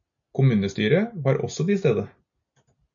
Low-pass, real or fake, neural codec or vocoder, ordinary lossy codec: 7.2 kHz; real; none; MP3, 64 kbps